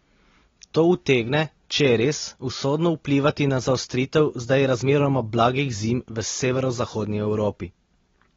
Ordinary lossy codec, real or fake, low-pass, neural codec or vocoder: AAC, 24 kbps; real; 7.2 kHz; none